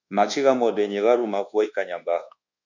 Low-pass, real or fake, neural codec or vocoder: 7.2 kHz; fake; codec, 24 kHz, 1.2 kbps, DualCodec